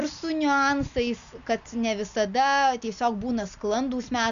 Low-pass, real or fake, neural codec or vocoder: 7.2 kHz; real; none